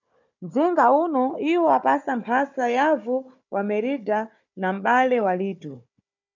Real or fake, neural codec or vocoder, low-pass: fake; codec, 16 kHz, 4 kbps, FunCodec, trained on Chinese and English, 50 frames a second; 7.2 kHz